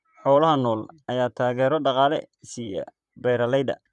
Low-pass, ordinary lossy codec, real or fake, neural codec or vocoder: 10.8 kHz; none; fake; vocoder, 44.1 kHz, 128 mel bands, Pupu-Vocoder